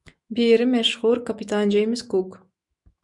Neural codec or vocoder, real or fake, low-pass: autoencoder, 48 kHz, 128 numbers a frame, DAC-VAE, trained on Japanese speech; fake; 10.8 kHz